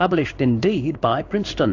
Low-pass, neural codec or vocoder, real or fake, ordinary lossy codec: 7.2 kHz; codec, 16 kHz in and 24 kHz out, 1 kbps, XY-Tokenizer; fake; AAC, 48 kbps